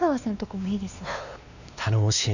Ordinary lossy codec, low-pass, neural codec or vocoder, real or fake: none; 7.2 kHz; codec, 16 kHz, 2 kbps, X-Codec, WavLM features, trained on Multilingual LibriSpeech; fake